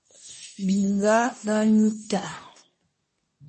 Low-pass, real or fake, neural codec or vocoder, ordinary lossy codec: 10.8 kHz; fake; codec, 24 kHz, 1 kbps, SNAC; MP3, 32 kbps